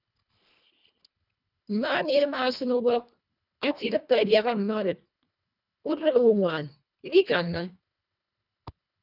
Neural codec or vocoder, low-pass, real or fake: codec, 24 kHz, 1.5 kbps, HILCodec; 5.4 kHz; fake